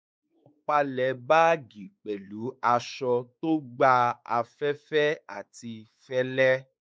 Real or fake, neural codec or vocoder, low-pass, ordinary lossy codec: fake; codec, 16 kHz, 4 kbps, X-Codec, WavLM features, trained on Multilingual LibriSpeech; none; none